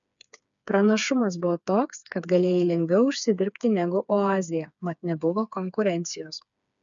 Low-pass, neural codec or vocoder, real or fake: 7.2 kHz; codec, 16 kHz, 4 kbps, FreqCodec, smaller model; fake